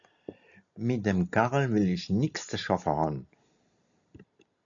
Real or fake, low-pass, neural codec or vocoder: real; 7.2 kHz; none